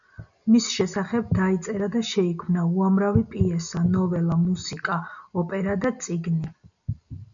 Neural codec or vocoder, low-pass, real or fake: none; 7.2 kHz; real